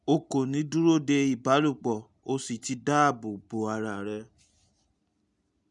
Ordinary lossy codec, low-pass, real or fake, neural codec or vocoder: none; 10.8 kHz; real; none